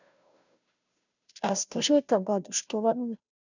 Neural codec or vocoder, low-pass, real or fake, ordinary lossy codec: codec, 16 kHz, 0.5 kbps, FunCodec, trained on Chinese and English, 25 frames a second; 7.2 kHz; fake; none